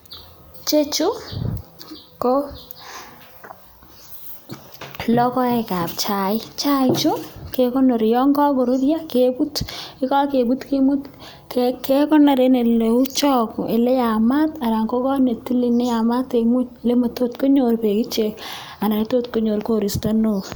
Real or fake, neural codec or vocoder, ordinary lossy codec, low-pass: real; none; none; none